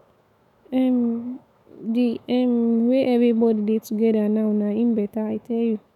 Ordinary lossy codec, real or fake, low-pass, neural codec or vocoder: none; fake; 19.8 kHz; autoencoder, 48 kHz, 128 numbers a frame, DAC-VAE, trained on Japanese speech